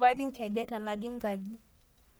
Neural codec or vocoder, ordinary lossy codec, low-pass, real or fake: codec, 44.1 kHz, 1.7 kbps, Pupu-Codec; none; none; fake